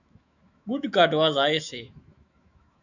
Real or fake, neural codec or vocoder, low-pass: fake; autoencoder, 48 kHz, 128 numbers a frame, DAC-VAE, trained on Japanese speech; 7.2 kHz